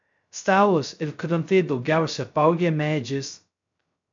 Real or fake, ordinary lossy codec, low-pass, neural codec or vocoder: fake; MP3, 64 kbps; 7.2 kHz; codec, 16 kHz, 0.2 kbps, FocalCodec